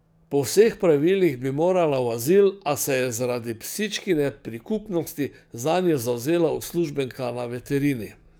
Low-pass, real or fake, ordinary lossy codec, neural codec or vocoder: none; fake; none; codec, 44.1 kHz, 7.8 kbps, DAC